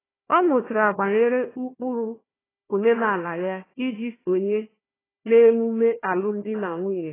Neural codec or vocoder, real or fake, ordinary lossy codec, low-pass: codec, 16 kHz, 1 kbps, FunCodec, trained on Chinese and English, 50 frames a second; fake; AAC, 16 kbps; 3.6 kHz